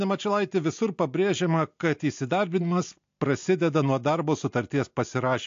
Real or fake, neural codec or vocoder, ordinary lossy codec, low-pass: real; none; AAC, 48 kbps; 7.2 kHz